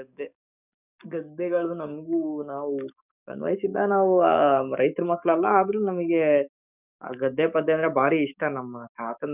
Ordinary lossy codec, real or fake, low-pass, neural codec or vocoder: none; fake; 3.6 kHz; codec, 44.1 kHz, 7.8 kbps, DAC